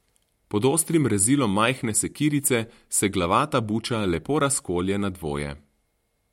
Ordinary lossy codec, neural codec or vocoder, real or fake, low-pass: MP3, 64 kbps; none; real; 19.8 kHz